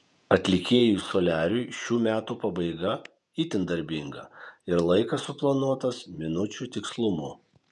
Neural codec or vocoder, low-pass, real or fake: vocoder, 48 kHz, 128 mel bands, Vocos; 10.8 kHz; fake